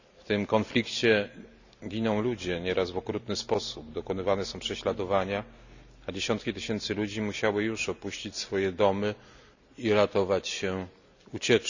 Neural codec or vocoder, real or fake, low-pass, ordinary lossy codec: none; real; 7.2 kHz; none